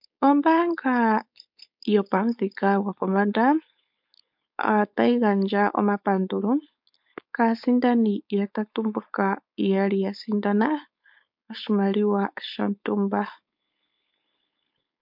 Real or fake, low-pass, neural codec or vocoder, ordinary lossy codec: fake; 5.4 kHz; codec, 16 kHz, 4.8 kbps, FACodec; MP3, 48 kbps